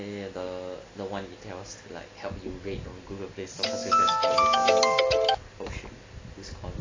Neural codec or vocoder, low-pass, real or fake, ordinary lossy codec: none; 7.2 kHz; real; AAC, 32 kbps